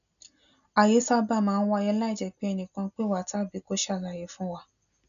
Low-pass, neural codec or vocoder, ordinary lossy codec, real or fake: 7.2 kHz; none; none; real